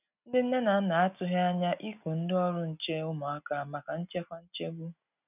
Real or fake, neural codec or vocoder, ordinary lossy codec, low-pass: real; none; none; 3.6 kHz